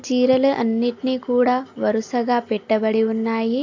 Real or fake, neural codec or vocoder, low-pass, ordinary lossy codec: real; none; 7.2 kHz; AAC, 48 kbps